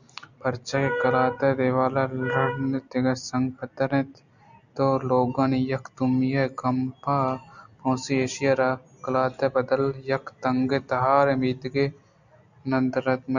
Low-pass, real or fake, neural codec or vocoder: 7.2 kHz; real; none